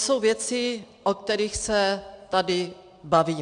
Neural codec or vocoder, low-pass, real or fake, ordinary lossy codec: none; 9.9 kHz; real; AAC, 64 kbps